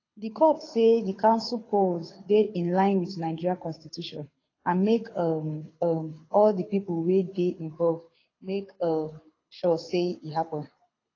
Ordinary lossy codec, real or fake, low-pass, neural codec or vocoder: AAC, 32 kbps; fake; 7.2 kHz; codec, 24 kHz, 6 kbps, HILCodec